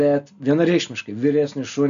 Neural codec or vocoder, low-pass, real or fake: none; 7.2 kHz; real